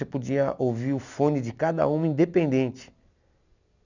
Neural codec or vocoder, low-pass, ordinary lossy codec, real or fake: none; 7.2 kHz; none; real